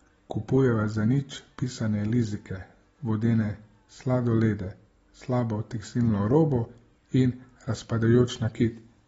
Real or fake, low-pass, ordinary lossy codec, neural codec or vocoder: real; 19.8 kHz; AAC, 24 kbps; none